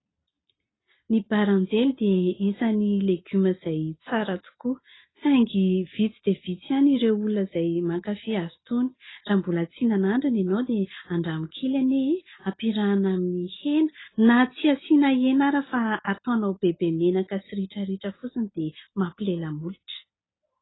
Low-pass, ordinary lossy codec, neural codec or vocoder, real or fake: 7.2 kHz; AAC, 16 kbps; none; real